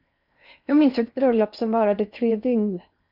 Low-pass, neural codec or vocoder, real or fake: 5.4 kHz; codec, 16 kHz in and 24 kHz out, 0.8 kbps, FocalCodec, streaming, 65536 codes; fake